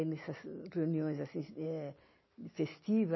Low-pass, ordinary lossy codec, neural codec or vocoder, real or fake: 7.2 kHz; MP3, 24 kbps; none; real